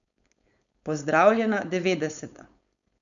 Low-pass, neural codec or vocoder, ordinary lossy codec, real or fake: 7.2 kHz; codec, 16 kHz, 4.8 kbps, FACodec; none; fake